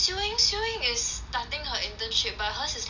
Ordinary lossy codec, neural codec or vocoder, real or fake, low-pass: none; none; real; 7.2 kHz